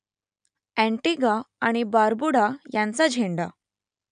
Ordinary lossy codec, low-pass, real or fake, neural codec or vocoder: none; 9.9 kHz; real; none